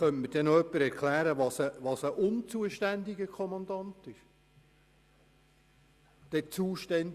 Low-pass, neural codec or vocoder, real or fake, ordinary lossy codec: 14.4 kHz; none; real; Opus, 64 kbps